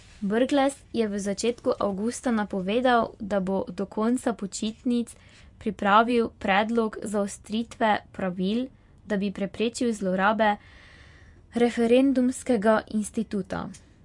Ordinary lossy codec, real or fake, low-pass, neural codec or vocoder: MP3, 64 kbps; fake; 10.8 kHz; vocoder, 24 kHz, 100 mel bands, Vocos